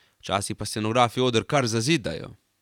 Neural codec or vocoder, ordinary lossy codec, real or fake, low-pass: vocoder, 44.1 kHz, 128 mel bands every 512 samples, BigVGAN v2; none; fake; 19.8 kHz